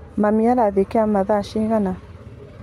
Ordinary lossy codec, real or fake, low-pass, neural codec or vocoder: MP3, 64 kbps; real; 19.8 kHz; none